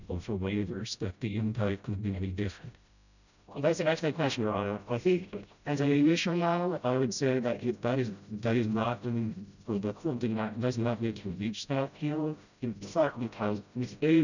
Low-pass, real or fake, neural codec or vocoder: 7.2 kHz; fake; codec, 16 kHz, 0.5 kbps, FreqCodec, smaller model